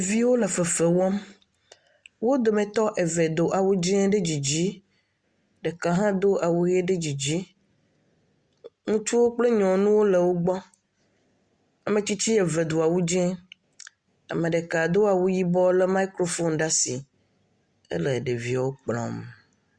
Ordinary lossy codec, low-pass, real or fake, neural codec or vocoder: Opus, 64 kbps; 9.9 kHz; real; none